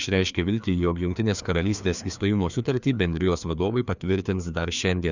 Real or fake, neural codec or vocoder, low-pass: fake; codec, 16 kHz, 2 kbps, FreqCodec, larger model; 7.2 kHz